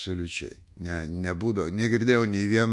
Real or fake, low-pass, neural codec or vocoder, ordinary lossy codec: fake; 10.8 kHz; codec, 24 kHz, 1.2 kbps, DualCodec; AAC, 64 kbps